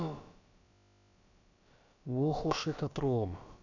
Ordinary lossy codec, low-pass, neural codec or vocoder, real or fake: none; 7.2 kHz; codec, 16 kHz, about 1 kbps, DyCAST, with the encoder's durations; fake